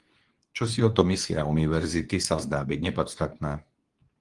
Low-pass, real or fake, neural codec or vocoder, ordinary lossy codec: 10.8 kHz; fake; codec, 24 kHz, 0.9 kbps, WavTokenizer, medium speech release version 2; Opus, 24 kbps